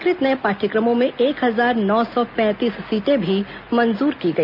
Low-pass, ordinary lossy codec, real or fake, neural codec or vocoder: 5.4 kHz; none; real; none